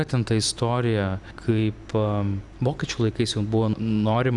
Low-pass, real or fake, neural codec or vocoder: 10.8 kHz; fake; vocoder, 44.1 kHz, 128 mel bands every 512 samples, BigVGAN v2